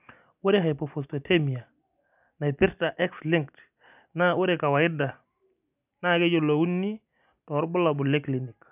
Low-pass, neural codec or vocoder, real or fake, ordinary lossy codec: 3.6 kHz; none; real; none